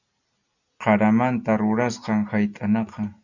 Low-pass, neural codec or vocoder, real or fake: 7.2 kHz; none; real